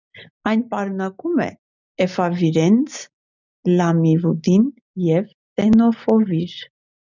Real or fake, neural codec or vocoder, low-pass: real; none; 7.2 kHz